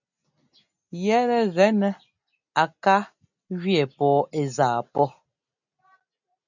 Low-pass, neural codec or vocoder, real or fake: 7.2 kHz; none; real